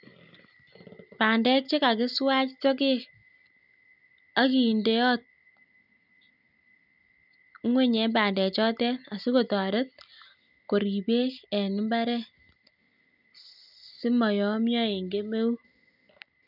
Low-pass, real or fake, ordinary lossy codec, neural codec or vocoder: 5.4 kHz; real; none; none